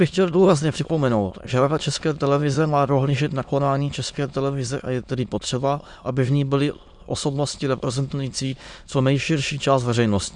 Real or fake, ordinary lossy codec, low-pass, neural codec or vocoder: fake; MP3, 96 kbps; 9.9 kHz; autoencoder, 22.05 kHz, a latent of 192 numbers a frame, VITS, trained on many speakers